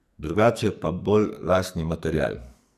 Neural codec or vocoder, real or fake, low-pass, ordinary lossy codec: codec, 44.1 kHz, 2.6 kbps, SNAC; fake; 14.4 kHz; none